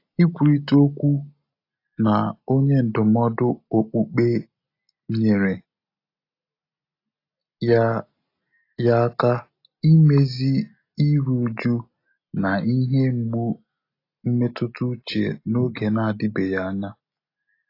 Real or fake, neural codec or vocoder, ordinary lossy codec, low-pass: real; none; AAC, 32 kbps; 5.4 kHz